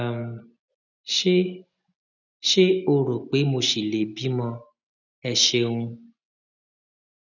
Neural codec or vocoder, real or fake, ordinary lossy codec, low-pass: none; real; none; 7.2 kHz